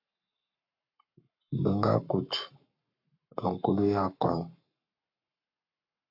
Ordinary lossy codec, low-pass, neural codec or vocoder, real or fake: MP3, 48 kbps; 5.4 kHz; codec, 44.1 kHz, 7.8 kbps, Pupu-Codec; fake